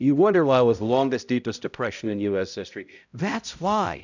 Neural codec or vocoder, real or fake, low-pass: codec, 16 kHz, 0.5 kbps, X-Codec, HuBERT features, trained on balanced general audio; fake; 7.2 kHz